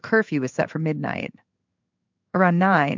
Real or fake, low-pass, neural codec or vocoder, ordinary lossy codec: fake; 7.2 kHz; codec, 16 kHz in and 24 kHz out, 1 kbps, XY-Tokenizer; MP3, 64 kbps